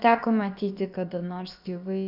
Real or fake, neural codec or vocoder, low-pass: fake; codec, 16 kHz, about 1 kbps, DyCAST, with the encoder's durations; 5.4 kHz